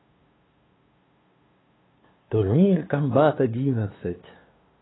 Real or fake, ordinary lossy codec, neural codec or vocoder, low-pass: fake; AAC, 16 kbps; codec, 16 kHz, 2 kbps, FunCodec, trained on LibriTTS, 25 frames a second; 7.2 kHz